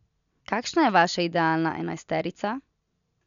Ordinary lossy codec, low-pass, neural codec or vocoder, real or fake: none; 7.2 kHz; none; real